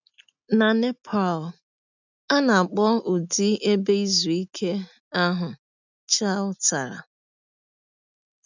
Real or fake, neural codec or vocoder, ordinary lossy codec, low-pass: real; none; none; 7.2 kHz